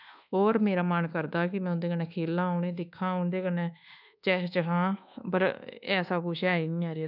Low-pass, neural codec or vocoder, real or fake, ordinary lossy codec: 5.4 kHz; codec, 24 kHz, 1.2 kbps, DualCodec; fake; none